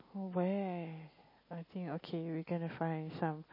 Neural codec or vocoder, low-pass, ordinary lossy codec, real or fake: none; 5.4 kHz; MP3, 24 kbps; real